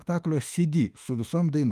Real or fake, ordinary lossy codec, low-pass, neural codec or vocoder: fake; Opus, 32 kbps; 14.4 kHz; autoencoder, 48 kHz, 32 numbers a frame, DAC-VAE, trained on Japanese speech